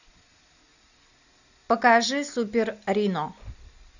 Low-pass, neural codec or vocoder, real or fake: 7.2 kHz; none; real